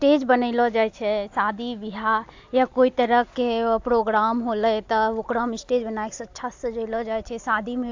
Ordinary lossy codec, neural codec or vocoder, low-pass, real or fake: AAC, 48 kbps; none; 7.2 kHz; real